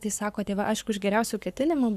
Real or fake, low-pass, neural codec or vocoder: fake; 14.4 kHz; codec, 44.1 kHz, 7.8 kbps, Pupu-Codec